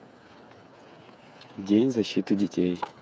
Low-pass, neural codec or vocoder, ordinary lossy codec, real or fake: none; codec, 16 kHz, 8 kbps, FreqCodec, smaller model; none; fake